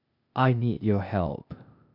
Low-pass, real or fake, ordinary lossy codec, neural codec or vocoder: 5.4 kHz; fake; none; codec, 16 kHz, 0.8 kbps, ZipCodec